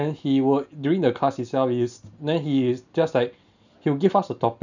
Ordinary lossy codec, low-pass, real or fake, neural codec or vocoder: none; 7.2 kHz; real; none